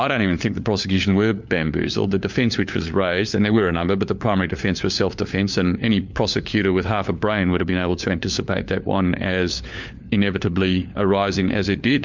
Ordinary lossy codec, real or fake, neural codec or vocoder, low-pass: MP3, 64 kbps; fake; codec, 16 kHz, 4 kbps, FunCodec, trained on LibriTTS, 50 frames a second; 7.2 kHz